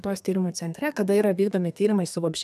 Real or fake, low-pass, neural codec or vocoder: fake; 14.4 kHz; codec, 32 kHz, 1.9 kbps, SNAC